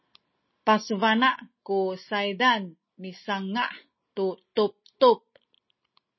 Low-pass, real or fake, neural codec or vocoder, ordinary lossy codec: 7.2 kHz; real; none; MP3, 24 kbps